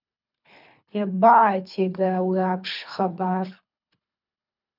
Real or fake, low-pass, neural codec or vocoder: fake; 5.4 kHz; codec, 24 kHz, 3 kbps, HILCodec